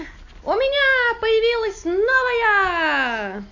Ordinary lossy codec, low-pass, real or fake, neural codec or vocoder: none; 7.2 kHz; real; none